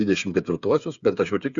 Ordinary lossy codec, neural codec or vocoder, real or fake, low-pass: Opus, 64 kbps; codec, 16 kHz, 8 kbps, FreqCodec, smaller model; fake; 7.2 kHz